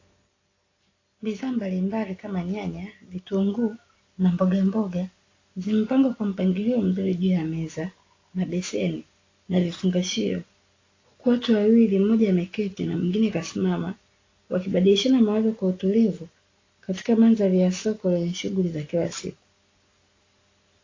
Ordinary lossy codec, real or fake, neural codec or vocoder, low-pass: AAC, 32 kbps; real; none; 7.2 kHz